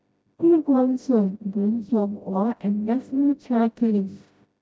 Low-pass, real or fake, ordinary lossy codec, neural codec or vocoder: none; fake; none; codec, 16 kHz, 0.5 kbps, FreqCodec, smaller model